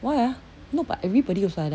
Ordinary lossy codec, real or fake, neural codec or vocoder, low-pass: none; real; none; none